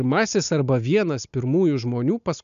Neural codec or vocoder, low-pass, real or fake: none; 7.2 kHz; real